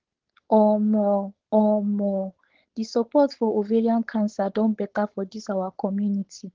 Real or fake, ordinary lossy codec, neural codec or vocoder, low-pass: fake; Opus, 16 kbps; codec, 16 kHz, 4.8 kbps, FACodec; 7.2 kHz